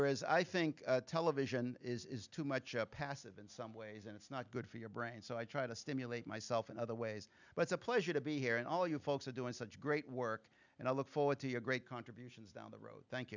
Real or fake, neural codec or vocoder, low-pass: real; none; 7.2 kHz